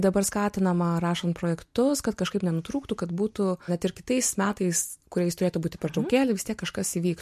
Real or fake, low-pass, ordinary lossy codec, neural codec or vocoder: real; 14.4 kHz; MP3, 64 kbps; none